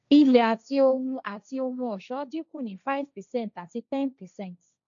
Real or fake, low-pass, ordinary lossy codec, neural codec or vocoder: fake; 7.2 kHz; none; codec, 16 kHz, 1.1 kbps, Voila-Tokenizer